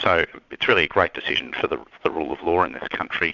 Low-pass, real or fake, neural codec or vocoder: 7.2 kHz; fake; vocoder, 22.05 kHz, 80 mel bands, Vocos